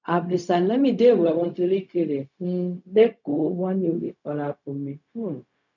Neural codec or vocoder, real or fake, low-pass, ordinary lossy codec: codec, 16 kHz, 0.4 kbps, LongCat-Audio-Codec; fake; 7.2 kHz; none